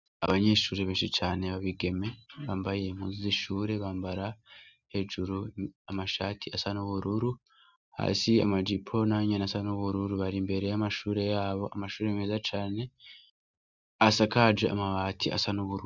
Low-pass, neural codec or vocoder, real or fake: 7.2 kHz; none; real